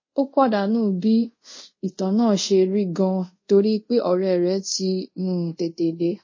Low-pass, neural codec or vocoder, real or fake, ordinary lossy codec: 7.2 kHz; codec, 24 kHz, 0.5 kbps, DualCodec; fake; MP3, 32 kbps